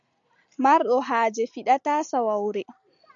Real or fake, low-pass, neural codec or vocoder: real; 7.2 kHz; none